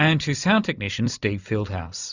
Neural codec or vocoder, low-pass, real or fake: vocoder, 44.1 kHz, 128 mel bands every 256 samples, BigVGAN v2; 7.2 kHz; fake